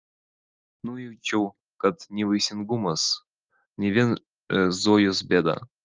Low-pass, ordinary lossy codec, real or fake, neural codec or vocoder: 7.2 kHz; Opus, 24 kbps; real; none